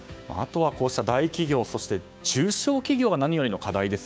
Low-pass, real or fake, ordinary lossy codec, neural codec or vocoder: none; fake; none; codec, 16 kHz, 6 kbps, DAC